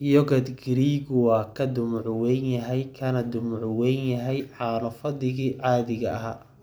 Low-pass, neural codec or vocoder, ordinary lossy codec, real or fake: none; none; none; real